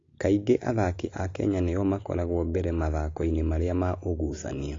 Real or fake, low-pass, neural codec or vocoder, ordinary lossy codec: real; 7.2 kHz; none; AAC, 32 kbps